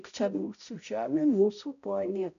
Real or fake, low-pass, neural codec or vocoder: fake; 7.2 kHz; codec, 16 kHz, 0.5 kbps, X-Codec, HuBERT features, trained on balanced general audio